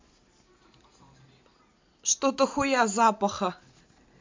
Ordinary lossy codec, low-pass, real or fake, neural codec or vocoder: MP3, 64 kbps; 7.2 kHz; real; none